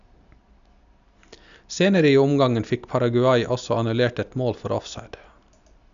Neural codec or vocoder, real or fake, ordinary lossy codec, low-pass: none; real; none; 7.2 kHz